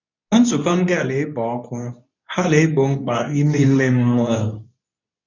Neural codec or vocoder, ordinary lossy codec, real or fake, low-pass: codec, 24 kHz, 0.9 kbps, WavTokenizer, medium speech release version 2; none; fake; 7.2 kHz